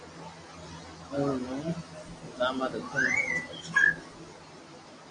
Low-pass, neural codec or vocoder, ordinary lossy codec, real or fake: 9.9 kHz; none; AAC, 48 kbps; real